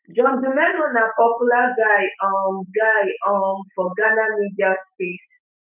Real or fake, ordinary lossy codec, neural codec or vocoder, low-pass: fake; none; autoencoder, 48 kHz, 128 numbers a frame, DAC-VAE, trained on Japanese speech; 3.6 kHz